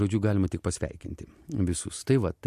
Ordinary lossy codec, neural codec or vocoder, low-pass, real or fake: MP3, 64 kbps; none; 14.4 kHz; real